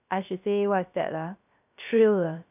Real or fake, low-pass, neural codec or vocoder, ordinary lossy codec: fake; 3.6 kHz; codec, 16 kHz, 0.3 kbps, FocalCodec; none